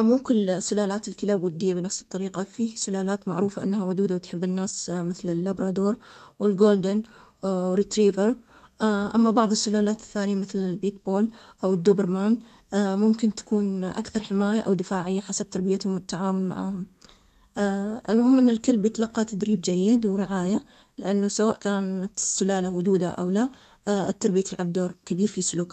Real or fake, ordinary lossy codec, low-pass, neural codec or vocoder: fake; none; 14.4 kHz; codec, 32 kHz, 1.9 kbps, SNAC